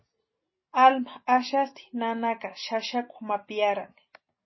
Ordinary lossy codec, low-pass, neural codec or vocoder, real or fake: MP3, 24 kbps; 7.2 kHz; none; real